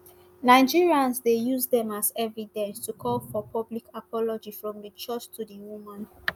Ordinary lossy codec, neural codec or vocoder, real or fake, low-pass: none; none; real; none